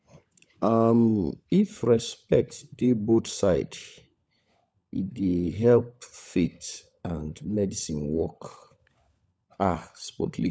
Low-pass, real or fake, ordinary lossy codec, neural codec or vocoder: none; fake; none; codec, 16 kHz, 16 kbps, FunCodec, trained on LibriTTS, 50 frames a second